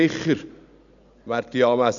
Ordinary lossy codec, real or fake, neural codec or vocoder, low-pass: none; real; none; 7.2 kHz